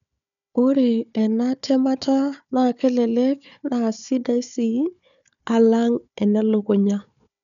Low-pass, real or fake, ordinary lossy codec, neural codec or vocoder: 7.2 kHz; fake; none; codec, 16 kHz, 4 kbps, FunCodec, trained on Chinese and English, 50 frames a second